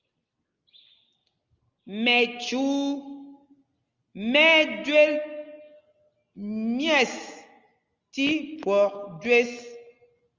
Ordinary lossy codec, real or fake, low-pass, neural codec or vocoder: Opus, 32 kbps; real; 7.2 kHz; none